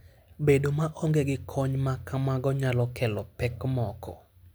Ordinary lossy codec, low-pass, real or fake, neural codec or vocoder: none; none; real; none